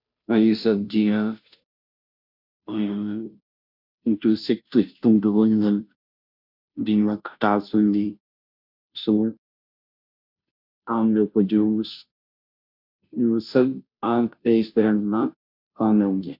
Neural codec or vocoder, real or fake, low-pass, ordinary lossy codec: codec, 16 kHz, 0.5 kbps, FunCodec, trained on Chinese and English, 25 frames a second; fake; 5.4 kHz; none